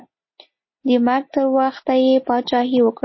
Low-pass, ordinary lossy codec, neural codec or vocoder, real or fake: 7.2 kHz; MP3, 24 kbps; none; real